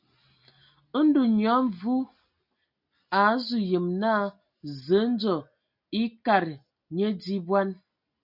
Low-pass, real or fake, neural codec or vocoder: 5.4 kHz; real; none